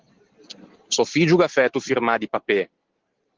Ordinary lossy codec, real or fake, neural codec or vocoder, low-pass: Opus, 16 kbps; real; none; 7.2 kHz